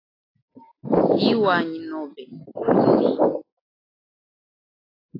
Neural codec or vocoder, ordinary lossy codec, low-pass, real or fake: none; AAC, 24 kbps; 5.4 kHz; real